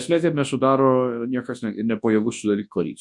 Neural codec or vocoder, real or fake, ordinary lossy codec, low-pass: codec, 24 kHz, 0.9 kbps, WavTokenizer, large speech release; fake; MP3, 64 kbps; 10.8 kHz